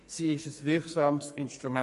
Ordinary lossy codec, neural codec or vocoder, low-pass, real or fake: MP3, 48 kbps; codec, 44.1 kHz, 2.6 kbps, SNAC; 14.4 kHz; fake